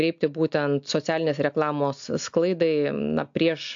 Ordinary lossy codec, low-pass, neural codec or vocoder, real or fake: AAC, 64 kbps; 7.2 kHz; none; real